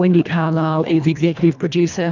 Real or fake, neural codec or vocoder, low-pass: fake; codec, 24 kHz, 1.5 kbps, HILCodec; 7.2 kHz